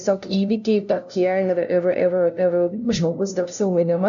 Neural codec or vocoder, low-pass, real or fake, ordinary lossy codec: codec, 16 kHz, 0.5 kbps, FunCodec, trained on LibriTTS, 25 frames a second; 7.2 kHz; fake; AAC, 64 kbps